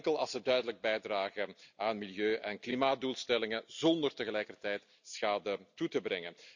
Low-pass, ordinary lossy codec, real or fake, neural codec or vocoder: 7.2 kHz; none; real; none